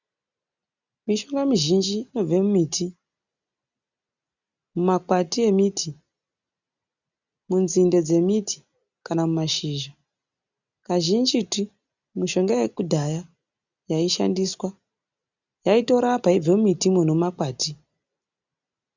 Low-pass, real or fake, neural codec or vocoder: 7.2 kHz; real; none